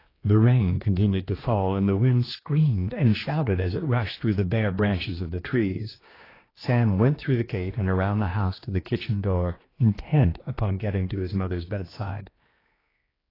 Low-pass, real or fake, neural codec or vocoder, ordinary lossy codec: 5.4 kHz; fake; codec, 16 kHz, 2 kbps, X-Codec, HuBERT features, trained on general audio; AAC, 24 kbps